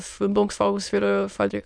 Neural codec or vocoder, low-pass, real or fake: autoencoder, 22.05 kHz, a latent of 192 numbers a frame, VITS, trained on many speakers; 9.9 kHz; fake